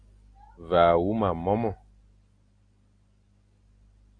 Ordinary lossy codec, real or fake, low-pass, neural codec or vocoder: AAC, 48 kbps; real; 9.9 kHz; none